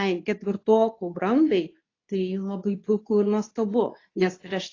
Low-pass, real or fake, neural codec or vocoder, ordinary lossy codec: 7.2 kHz; fake; codec, 24 kHz, 0.9 kbps, WavTokenizer, medium speech release version 2; AAC, 32 kbps